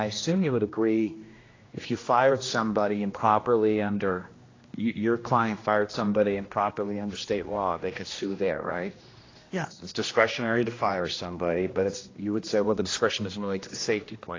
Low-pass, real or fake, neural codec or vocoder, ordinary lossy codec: 7.2 kHz; fake; codec, 16 kHz, 1 kbps, X-Codec, HuBERT features, trained on general audio; AAC, 32 kbps